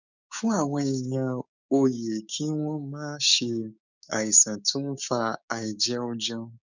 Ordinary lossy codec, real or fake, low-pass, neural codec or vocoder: none; fake; 7.2 kHz; codec, 16 kHz, 6 kbps, DAC